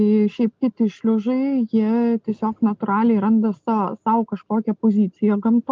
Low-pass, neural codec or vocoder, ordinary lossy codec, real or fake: 7.2 kHz; none; Opus, 24 kbps; real